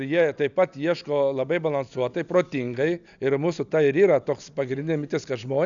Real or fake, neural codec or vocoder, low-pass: real; none; 7.2 kHz